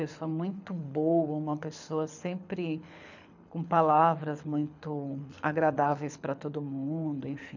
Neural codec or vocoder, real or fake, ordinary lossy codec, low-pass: codec, 24 kHz, 6 kbps, HILCodec; fake; none; 7.2 kHz